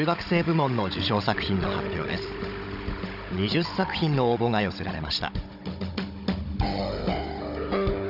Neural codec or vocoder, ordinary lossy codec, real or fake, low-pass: codec, 16 kHz, 16 kbps, FunCodec, trained on Chinese and English, 50 frames a second; none; fake; 5.4 kHz